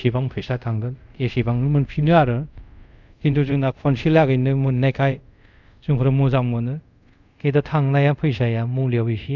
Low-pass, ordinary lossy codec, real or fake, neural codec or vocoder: 7.2 kHz; none; fake; codec, 24 kHz, 0.5 kbps, DualCodec